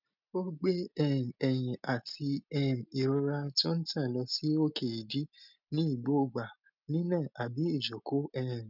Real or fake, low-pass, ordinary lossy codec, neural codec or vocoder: real; 5.4 kHz; none; none